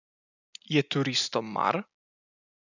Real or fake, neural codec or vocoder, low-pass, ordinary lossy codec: real; none; 7.2 kHz; none